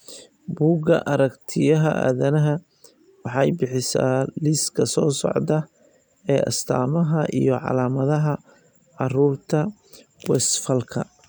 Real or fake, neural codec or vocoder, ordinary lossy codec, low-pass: real; none; none; 19.8 kHz